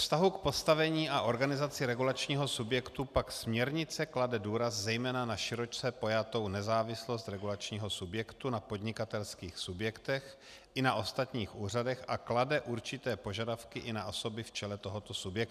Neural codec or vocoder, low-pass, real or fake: none; 14.4 kHz; real